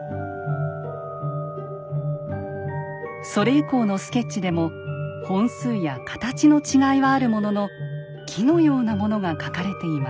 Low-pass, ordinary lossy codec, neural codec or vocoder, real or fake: none; none; none; real